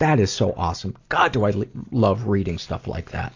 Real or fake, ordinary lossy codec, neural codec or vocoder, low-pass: real; AAC, 48 kbps; none; 7.2 kHz